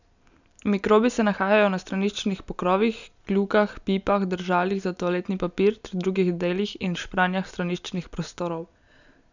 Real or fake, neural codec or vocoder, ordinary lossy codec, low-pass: real; none; none; 7.2 kHz